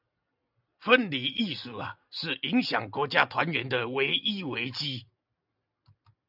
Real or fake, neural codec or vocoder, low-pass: real; none; 5.4 kHz